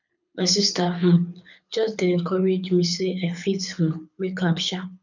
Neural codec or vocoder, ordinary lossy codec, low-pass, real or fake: codec, 24 kHz, 6 kbps, HILCodec; none; 7.2 kHz; fake